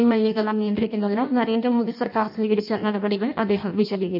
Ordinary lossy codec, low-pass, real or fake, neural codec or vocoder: none; 5.4 kHz; fake; codec, 16 kHz in and 24 kHz out, 0.6 kbps, FireRedTTS-2 codec